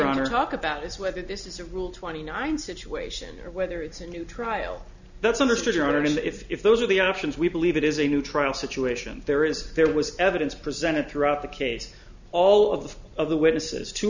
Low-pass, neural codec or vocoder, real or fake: 7.2 kHz; none; real